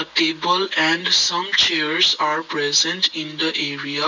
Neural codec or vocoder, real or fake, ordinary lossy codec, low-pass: none; real; none; 7.2 kHz